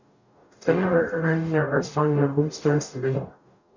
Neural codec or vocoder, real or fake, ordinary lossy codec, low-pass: codec, 44.1 kHz, 0.9 kbps, DAC; fake; none; 7.2 kHz